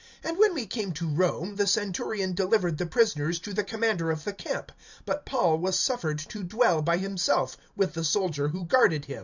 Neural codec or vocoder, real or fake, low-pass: vocoder, 44.1 kHz, 128 mel bands every 512 samples, BigVGAN v2; fake; 7.2 kHz